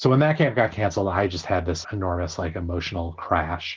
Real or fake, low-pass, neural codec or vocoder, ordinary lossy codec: real; 7.2 kHz; none; Opus, 16 kbps